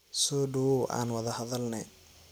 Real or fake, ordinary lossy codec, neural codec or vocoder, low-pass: real; none; none; none